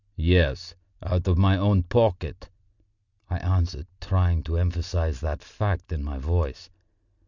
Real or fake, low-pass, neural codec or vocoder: real; 7.2 kHz; none